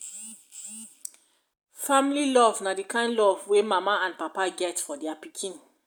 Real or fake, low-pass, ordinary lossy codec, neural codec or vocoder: real; none; none; none